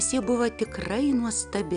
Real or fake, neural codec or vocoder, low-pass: real; none; 9.9 kHz